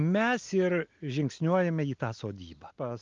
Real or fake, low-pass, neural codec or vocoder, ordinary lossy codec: real; 7.2 kHz; none; Opus, 32 kbps